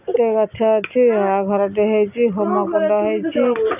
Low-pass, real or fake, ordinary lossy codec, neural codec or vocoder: 3.6 kHz; real; none; none